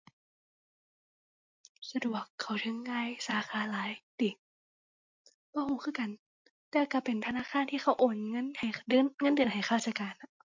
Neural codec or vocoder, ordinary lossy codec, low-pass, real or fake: none; MP3, 48 kbps; 7.2 kHz; real